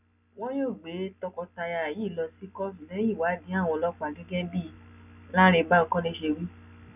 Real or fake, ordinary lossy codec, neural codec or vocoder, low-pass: real; none; none; 3.6 kHz